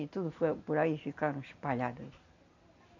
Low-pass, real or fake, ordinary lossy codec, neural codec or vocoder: 7.2 kHz; real; none; none